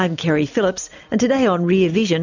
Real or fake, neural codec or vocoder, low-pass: real; none; 7.2 kHz